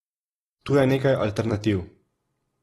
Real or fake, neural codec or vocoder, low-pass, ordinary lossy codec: fake; vocoder, 44.1 kHz, 128 mel bands every 256 samples, BigVGAN v2; 19.8 kHz; AAC, 32 kbps